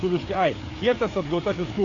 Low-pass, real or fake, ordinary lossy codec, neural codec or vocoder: 7.2 kHz; fake; AAC, 32 kbps; codec, 16 kHz, 4 kbps, FreqCodec, larger model